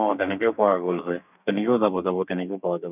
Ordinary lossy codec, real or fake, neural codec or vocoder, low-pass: none; fake; codec, 32 kHz, 1.9 kbps, SNAC; 3.6 kHz